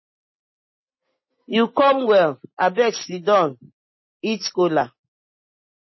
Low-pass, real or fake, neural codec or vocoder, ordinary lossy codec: 7.2 kHz; real; none; MP3, 24 kbps